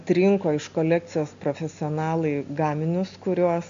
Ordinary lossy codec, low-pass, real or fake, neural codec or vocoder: MP3, 64 kbps; 7.2 kHz; real; none